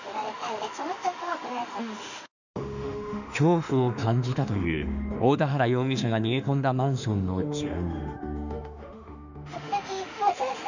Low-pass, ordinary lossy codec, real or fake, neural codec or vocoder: 7.2 kHz; none; fake; autoencoder, 48 kHz, 32 numbers a frame, DAC-VAE, trained on Japanese speech